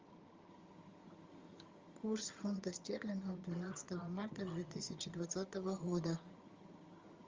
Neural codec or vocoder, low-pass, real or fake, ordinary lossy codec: vocoder, 22.05 kHz, 80 mel bands, HiFi-GAN; 7.2 kHz; fake; Opus, 32 kbps